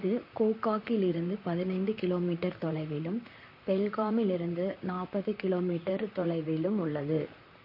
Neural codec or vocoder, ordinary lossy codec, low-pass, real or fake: vocoder, 44.1 kHz, 128 mel bands, Pupu-Vocoder; MP3, 32 kbps; 5.4 kHz; fake